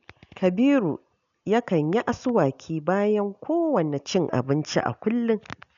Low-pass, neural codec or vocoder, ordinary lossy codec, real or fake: 7.2 kHz; none; none; real